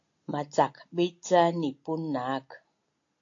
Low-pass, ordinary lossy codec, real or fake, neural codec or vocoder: 7.2 kHz; AAC, 48 kbps; real; none